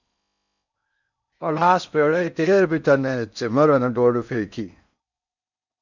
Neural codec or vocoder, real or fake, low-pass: codec, 16 kHz in and 24 kHz out, 0.6 kbps, FocalCodec, streaming, 4096 codes; fake; 7.2 kHz